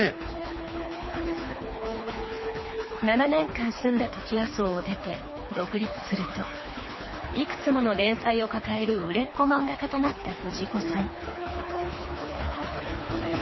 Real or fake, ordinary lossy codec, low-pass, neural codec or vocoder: fake; MP3, 24 kbps; 7.2 kHz; codec, 24 kHz, 3 kbps, HILCodec